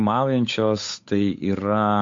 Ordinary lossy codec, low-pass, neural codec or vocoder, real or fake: MP3, 48 kbps; 7.2 kHz; codec, 16 kHz, 8 kbps, FunCodec, trained on Chinese and English, 25 frames a second; fake